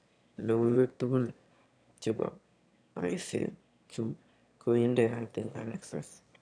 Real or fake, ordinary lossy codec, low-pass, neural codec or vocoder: fake; none; 9.9 kHz; autoencoder, 22.05 kHz, a latent of 192 numbers a frame, VITS, trained on one speaker